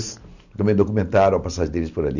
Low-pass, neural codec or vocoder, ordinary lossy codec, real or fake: 7.2 kHz; none; none; real